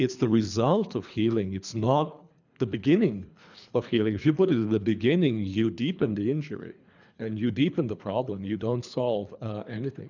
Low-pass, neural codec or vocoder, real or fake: 7.2 kHz; codec, 24 kHz, 3 kbps, HILCodec; fake